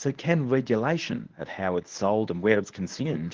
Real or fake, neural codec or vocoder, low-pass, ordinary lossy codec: fake; codec, 24 kHz, 0.9 kbps, WavTokenizer, medium speech release version 1; 7.2 kHz; Opus, 16 kbps